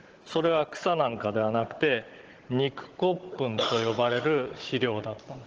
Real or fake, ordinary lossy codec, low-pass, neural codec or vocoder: fake; Opus, 16 kbps; 7.2 kHz; codec, 16 kHz, 16 kbps, FunCodec, trained on Chinese and English, 50 frames a second